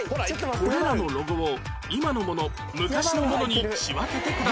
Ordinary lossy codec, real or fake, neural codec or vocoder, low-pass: none; real; none; none